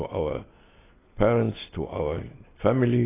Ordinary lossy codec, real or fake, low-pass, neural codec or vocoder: AAC, 16 kbps; real; 3.6 kHz; none